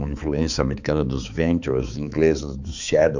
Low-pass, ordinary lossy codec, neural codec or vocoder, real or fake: 7.2 kHz; none; codec, 16 kHz, 4 kbps, X-Codec, HuBERT features, trained on balanced general audio; fake